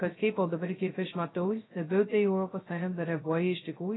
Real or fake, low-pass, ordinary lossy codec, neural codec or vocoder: fake; 7.2 kHz; AAC, 16 kbps; codec, 16 kHz, 0.2 kbps, FocalCodec